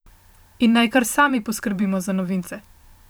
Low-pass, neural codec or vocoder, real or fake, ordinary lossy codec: none; vocoder, 44.1 kHz, 128 mel bands every 512 samples, BigVGAN v2; fake; none